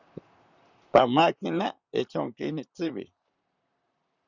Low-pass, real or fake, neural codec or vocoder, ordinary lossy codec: 7.2 kHz; fake; vocoder, 44.1 kHz, 128 mel bands, Pupu-Vocoder; Opus, 64 kbps